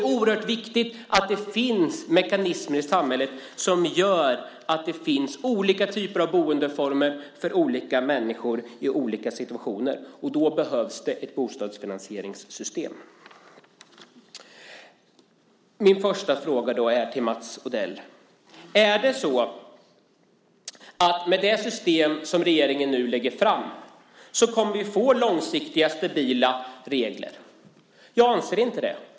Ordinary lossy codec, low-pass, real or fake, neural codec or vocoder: none; none; real; none